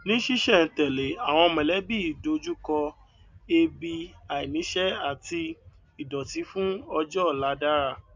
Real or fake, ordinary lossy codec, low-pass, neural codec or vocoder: real; MP3, 64 kbps; 7.2 kHz; none